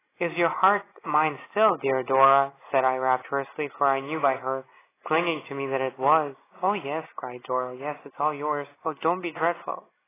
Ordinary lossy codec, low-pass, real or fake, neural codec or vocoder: AAC, 16 kbps; 3.6 kHz; real; none